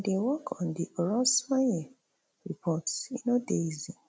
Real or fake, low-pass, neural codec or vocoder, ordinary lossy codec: real; none; none; none